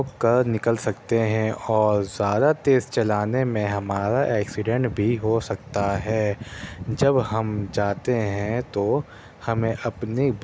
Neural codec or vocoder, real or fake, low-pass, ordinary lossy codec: none; real; none; none